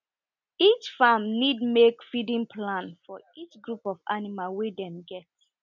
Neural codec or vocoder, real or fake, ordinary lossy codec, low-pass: none; real; none; 7.2 kHz